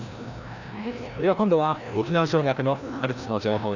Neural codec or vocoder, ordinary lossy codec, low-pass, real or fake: codec, 16 kHz, 1 kbps, FreqCodec, larger model; none; 7.2 kHz; fake